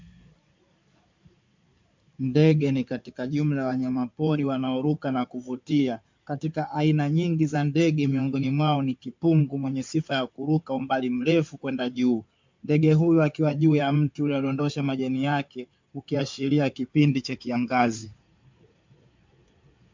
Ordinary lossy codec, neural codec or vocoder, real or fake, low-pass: MP3, 64 kbps; codec, 16 kHz in and 24 kHz out, 2.2 kbps, FireRedTTS-2 codec; fake; 7.2 kHz